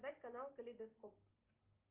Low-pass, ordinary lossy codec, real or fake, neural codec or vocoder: 3.6 kHz; Opus, 32 kbps; real; none